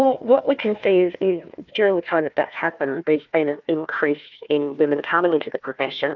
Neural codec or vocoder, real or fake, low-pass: codec, 16 kHz, 1 kbps, FunCodec, trained on Chinese and English, 50 frames a second; fake; 7.2 kHz